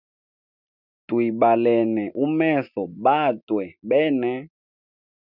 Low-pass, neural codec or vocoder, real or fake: 5.4 kHz; none; real